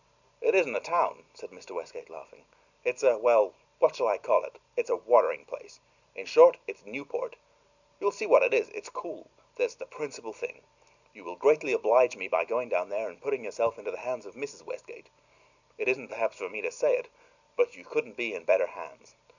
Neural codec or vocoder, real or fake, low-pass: none; real; 7.2 kHz